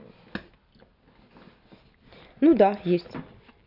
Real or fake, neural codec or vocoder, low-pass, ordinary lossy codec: real; none; 5.4 kHz; none